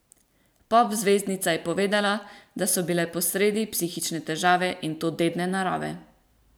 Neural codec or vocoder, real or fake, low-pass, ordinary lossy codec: vocoder, 44.1 kHz, 128 mel bands every 512 samples, BigVGAN v2; fake; none; none